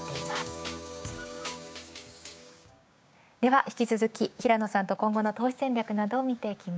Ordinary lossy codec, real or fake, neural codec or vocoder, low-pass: none; fake; codec, 16 kHz, 6 kbps, DAC; none